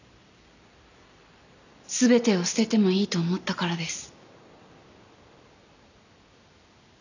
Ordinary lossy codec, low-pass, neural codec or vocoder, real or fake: none; 7.2 kHz; none; real